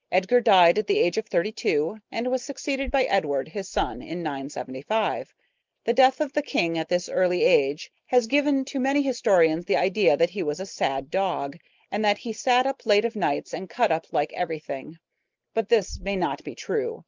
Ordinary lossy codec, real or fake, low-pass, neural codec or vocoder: Opus, 24 kbps; real; 7.2 kHz; none